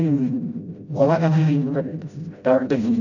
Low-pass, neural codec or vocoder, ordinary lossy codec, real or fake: 7.2 kHz; codec, 16 kHz, 0.5 kbps, FreqCodec, smaller model; none; fake